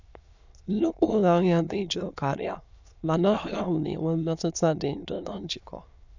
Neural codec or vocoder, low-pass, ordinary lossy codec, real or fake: autoencoder, 22.05 kHz, a latent of 192 numbers a frame, VITS, trained on many speakers; 7.2 kHz; none; fake